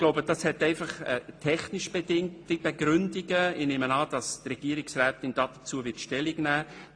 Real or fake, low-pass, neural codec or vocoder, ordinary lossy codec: real; 9.9 kHz; none; AAC, 48 kbps